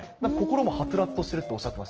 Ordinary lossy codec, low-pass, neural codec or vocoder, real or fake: Opus, 16 kbps; 7.2 kHz; none; real